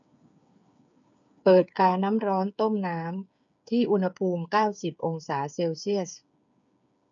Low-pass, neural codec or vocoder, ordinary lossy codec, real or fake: 7.2 kHz; codec, 16 kHz, 8 kbps, FreqCodec, smaller model; none; fake